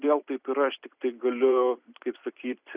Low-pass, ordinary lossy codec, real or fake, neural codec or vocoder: 3.6 kHz; Opus, 64 kbps; fake; vocoder, 44.1 kHz, 128 mel bands every 256 samples, BigVGAN v2